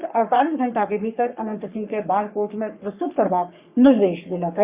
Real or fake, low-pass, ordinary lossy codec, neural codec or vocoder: fake; 3.6 kHz; Opus, 64 kbps; codec, 44.1 kHz, 3.4 kbps, Pupu-Codec